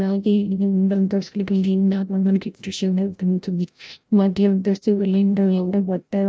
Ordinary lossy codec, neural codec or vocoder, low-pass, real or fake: none; codec, 16 kHz, 0.5 kbps, FreqCodec, larger model; none; fake